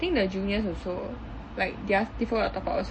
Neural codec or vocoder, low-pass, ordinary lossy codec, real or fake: none; 9.9 kHz; MP3, 32 kbps; real